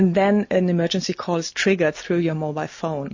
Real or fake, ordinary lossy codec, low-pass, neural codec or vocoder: real; MP3, 32 kbps; 7.2 kHz; none